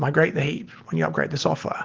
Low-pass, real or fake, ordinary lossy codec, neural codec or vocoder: 7.2 kHz; real; Opus, 16 kbps; none